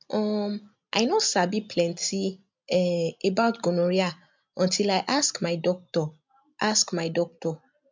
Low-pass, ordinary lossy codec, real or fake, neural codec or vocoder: 7.2 kHz; MP3, 64 kbps; real; none